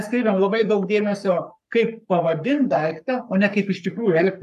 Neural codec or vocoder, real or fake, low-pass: codec, 44.1 kHz, 3.4 kbps, Pupu-Codec; fake; 14.4 kHz